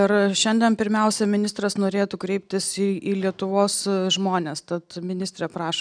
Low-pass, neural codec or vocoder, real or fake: 9.9 kHz; none; real